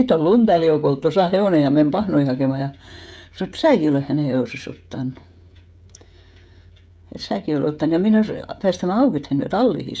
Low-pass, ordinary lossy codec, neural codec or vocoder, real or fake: none; none; codec, 16 kHz, 16 kbps, FreqCodec, smaller model; fake